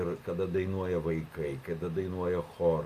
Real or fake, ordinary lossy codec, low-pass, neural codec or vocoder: real; Opus, 64 kbps; 14.4 kHz; none